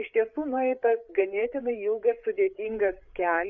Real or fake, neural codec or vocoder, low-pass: fake; codec, 16 kHz, 8 kbps, FreqCodec, larger model; 7.2 kHz